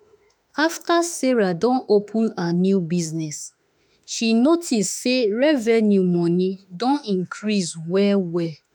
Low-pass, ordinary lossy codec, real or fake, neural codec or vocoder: none; none; fake; autoencoder, 48 kHz, 32 numbers a frame, DAC-VAE, trained on Japanese speech